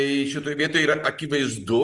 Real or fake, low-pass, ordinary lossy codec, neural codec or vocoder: real; 10.8 kHz; Opus, 24 kbps; none